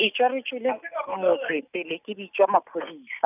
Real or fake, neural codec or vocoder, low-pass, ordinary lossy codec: real; none; 3.6 kHz; none